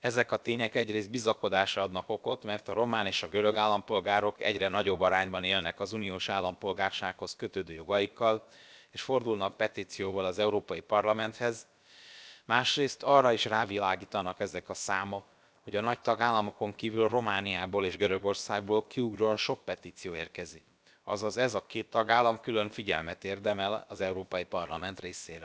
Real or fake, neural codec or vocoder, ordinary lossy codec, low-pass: fake; codec, 16 kHz, about 1 kbps, DyCAST, with the encoder's durations; none; none